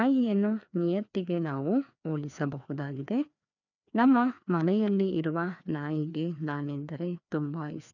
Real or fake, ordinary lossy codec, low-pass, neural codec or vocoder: fake; none; 7.2 kHz; codec, 16 kHz, 2 kbps, FreqCodec, larger model